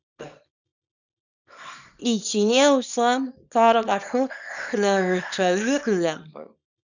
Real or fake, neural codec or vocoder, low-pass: fake; codec, 24 kHz, 0.9 kbps, WavTokenizer, small release; 7.2 kHz